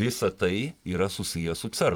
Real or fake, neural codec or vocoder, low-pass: fake; vocoder, 44.1 kHz, 128 mel bands every 512 samples, BigVGAN v2; 19.8 kHz